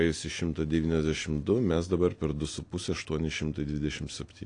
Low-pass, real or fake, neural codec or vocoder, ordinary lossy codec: 9.9 kHz; real; none; AAC, 48 kbps